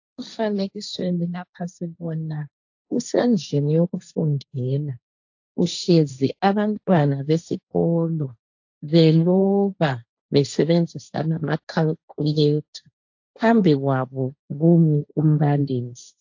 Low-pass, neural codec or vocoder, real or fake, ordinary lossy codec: 7.2 kHz; codec, 16 kHz, 1.1 kbps, Voila-Tokenizer; fake; AAC, 48 kbps